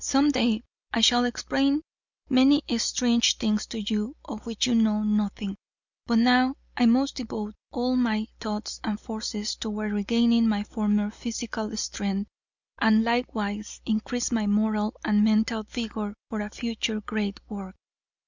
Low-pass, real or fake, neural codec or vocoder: 7.2 kHz; real; none